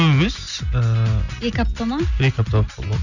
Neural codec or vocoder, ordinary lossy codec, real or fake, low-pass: none; none; real; 7.2 kHz